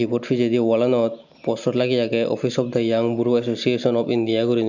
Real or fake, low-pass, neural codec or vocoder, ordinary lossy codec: real; 7.2 kHz; none; none